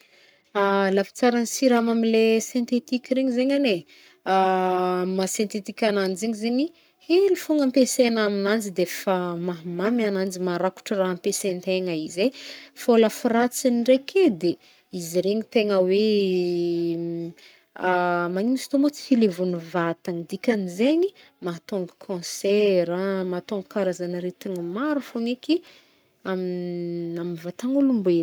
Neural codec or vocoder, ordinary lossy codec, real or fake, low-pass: codec, 44.1 kHz, 7.8 kbps, Pupu-Codec; none; fake; none